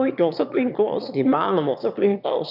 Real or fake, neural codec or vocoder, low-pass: fake; autoencoder, 22.05 kHz, a latent of 192 numbers a frame, VITS, trained on one speaker; 5.4 kHz